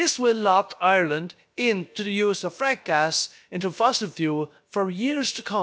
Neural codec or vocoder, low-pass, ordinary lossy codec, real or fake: codec, 16 kHz, about 1 kbps, DyCAST, with the encoder's durations; none; none; fake